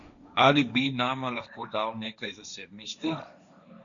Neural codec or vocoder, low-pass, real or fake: codec, 16 kHz, 1.1 kbps, Voila-Tokenizer; 7.2 kHz; fake